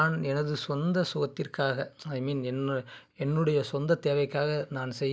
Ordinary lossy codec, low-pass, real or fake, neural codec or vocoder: none; none; real; none